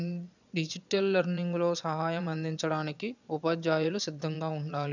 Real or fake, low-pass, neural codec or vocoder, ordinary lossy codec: fake; 7.2 kHz; vocoder, 22.05 kHz, 80 mel bands, Vocos; none